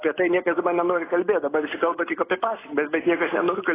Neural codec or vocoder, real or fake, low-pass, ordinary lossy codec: none; real; 3.6 kHz; AAC, 16 kbps